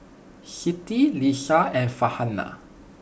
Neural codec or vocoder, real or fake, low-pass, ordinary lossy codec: none; real; none; none